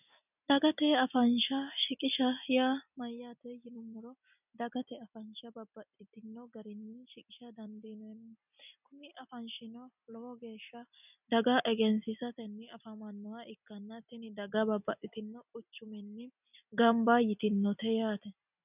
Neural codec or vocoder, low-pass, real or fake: none; 3.6 kHz; real